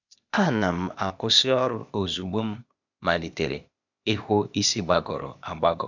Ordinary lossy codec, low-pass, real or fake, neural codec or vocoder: none; 7.2 kHz; fake; codec, 16 kHz, 0.8 kbps, ZipCodec